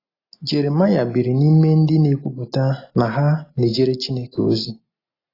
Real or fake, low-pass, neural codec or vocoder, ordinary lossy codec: real; 5.4 kHz; none; AAC, 24 kbps